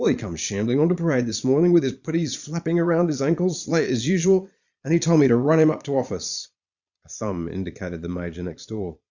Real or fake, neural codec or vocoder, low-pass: fake; vocoder, 22.05 kHz, 80 mel bands, Vocos; 7.2 kHz